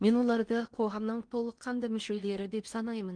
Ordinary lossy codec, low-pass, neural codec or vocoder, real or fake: Opus, 32 kbps; 9.9 kHz; codec, 16 kHz in and 24 kHz out, 0.8 kbps, FocalCodec, streaming, 65536 codes; fake